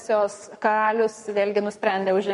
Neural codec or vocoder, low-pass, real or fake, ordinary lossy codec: codec, 44.1 kHz, 7.8 kbps, Pupu-Codec; 14.4 kHz; fake; MP3, 48 kbps